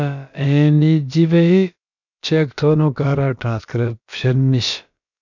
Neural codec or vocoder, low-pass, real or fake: codec, 16 kHz, about 1 kbps, DyCAST, with the encoder's durations; 7.2 kHz; fake